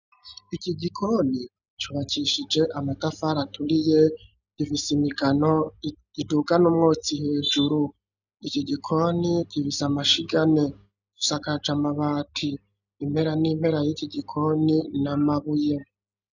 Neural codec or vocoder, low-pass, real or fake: none; 7.2 kHz; real